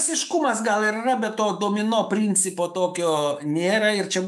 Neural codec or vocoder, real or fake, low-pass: autoencoder, 48 kHz, 128 numbers a frame, DAC-VAE, trained on Japanese speech; fake; 14.4 kHz